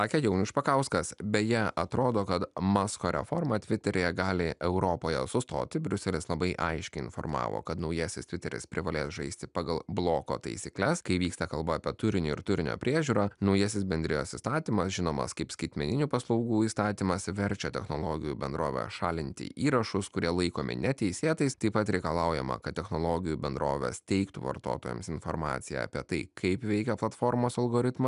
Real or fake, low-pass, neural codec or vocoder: real; 10.8 kHz; none